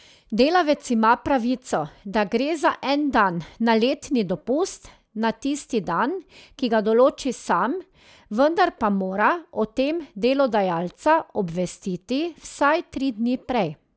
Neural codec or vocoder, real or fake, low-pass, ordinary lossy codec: none; real; none; none